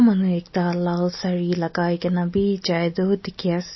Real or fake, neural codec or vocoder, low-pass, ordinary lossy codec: real; none; 7.2 kHz; MP3, 24 kbps